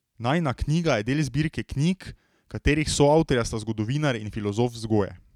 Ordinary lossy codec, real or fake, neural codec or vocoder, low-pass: none; real; none; 19.8 kHz